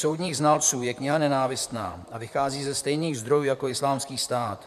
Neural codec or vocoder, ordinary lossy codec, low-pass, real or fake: vocoder, 44.1 kHz, 128 mel bands, Pupu-Vocoder; MP3, 96 kbps; 14.4 kHz; fake